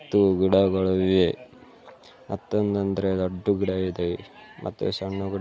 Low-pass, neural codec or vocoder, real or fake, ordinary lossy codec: none; none; real; none